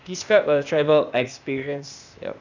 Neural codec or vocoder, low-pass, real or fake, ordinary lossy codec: codec, 16 kHz, 0.8 kbps, ZipCodec; 7.2 kHz; fake; none